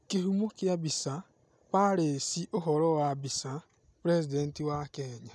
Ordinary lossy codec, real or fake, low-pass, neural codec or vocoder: none; real; none; none